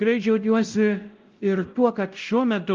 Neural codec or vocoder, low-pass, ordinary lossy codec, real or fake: codec, 16 kHz, 0.5 kbps, X-Codec, WavLM features, trained on Multilingual LibriSpeech; 7.2 kHz; Opus, 32 kbps; fake